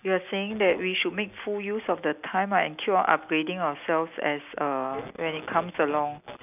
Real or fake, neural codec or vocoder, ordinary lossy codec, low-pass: real; none; none; 3.6 kHz